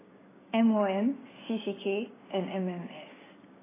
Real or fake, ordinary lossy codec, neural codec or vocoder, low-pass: fake; AAC, 16 kbps; codec, 16 kHz, 6 kbps, DAC; 3.6 kHz